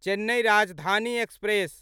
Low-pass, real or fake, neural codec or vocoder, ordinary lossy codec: 19.8 kHz; real; none; none